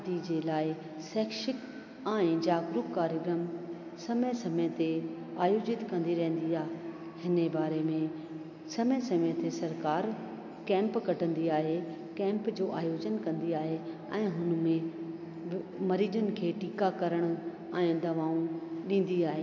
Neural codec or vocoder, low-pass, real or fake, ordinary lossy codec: none; 7.2 kHz; real; MP3, 48 kbps